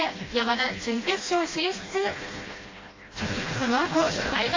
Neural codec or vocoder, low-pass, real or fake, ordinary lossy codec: codec, 16 kHz, 1 kbps, FreqCodec, smaller model; 7.2 kHz; fake; AAC, 32 kbps